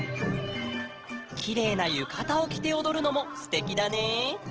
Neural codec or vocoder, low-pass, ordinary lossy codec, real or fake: none; 7.2 kHz; Opus, 16 kbps; real